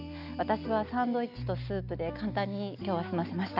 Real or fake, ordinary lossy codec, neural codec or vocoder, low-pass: real; none; none; 5.4 kHz